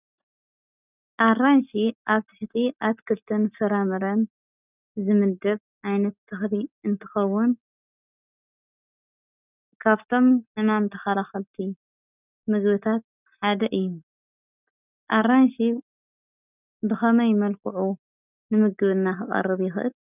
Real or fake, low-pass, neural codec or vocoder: real; 3.6 kHz; none